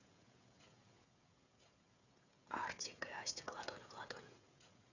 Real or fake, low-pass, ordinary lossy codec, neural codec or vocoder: fake; 7.2 kHz; none; codec, 16 kHz, 16 kbps, FreqCodec, smaller model